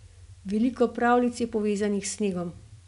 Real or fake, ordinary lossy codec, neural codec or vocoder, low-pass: real; none; none; 10.8 kHz